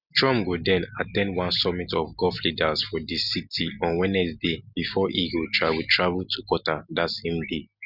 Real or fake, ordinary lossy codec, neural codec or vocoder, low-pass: real; none; none; 5.4 kHz